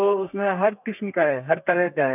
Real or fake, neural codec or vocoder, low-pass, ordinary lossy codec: fake; codec, 32 kHz, 1.9 kbps, SNAC; 3.6 kHz; none